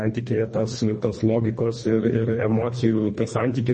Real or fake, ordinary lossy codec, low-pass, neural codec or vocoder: fake; MP3, 32 kbps; 10.8 kHz; codec, 24 kHz, 1.5 kbps, HILCodec